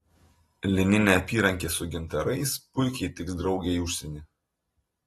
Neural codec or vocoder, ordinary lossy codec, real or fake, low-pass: none; AAC, 32 kbps; real; 14.4 kHz